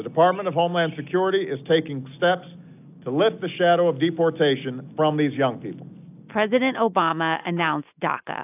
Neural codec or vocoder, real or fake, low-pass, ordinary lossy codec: none; real; 3.6 kHz; AAC, 32 kbps